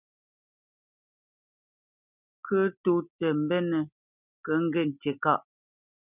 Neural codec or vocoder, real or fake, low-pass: none; real; 3.6 kHz